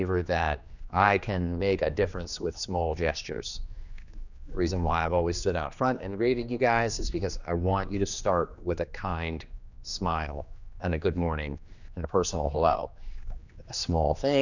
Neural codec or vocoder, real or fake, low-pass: codec, 16 kHz, 2 kbps, X-Codec, HuBERT features, trained on general audio; fake; 7.2 kHz